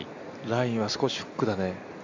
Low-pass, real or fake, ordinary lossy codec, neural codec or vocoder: 7.2 kHz; real; AAC, 48 kbps; none